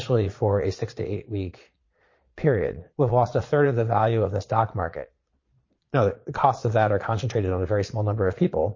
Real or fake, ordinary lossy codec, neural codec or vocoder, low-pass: fake; MP3, 32 kbps; vocoder, 22.05 kHz, 80 mel bands, Vocos; 7.2 kHz